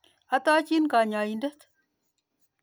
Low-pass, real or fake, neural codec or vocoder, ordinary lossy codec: none; real; none; none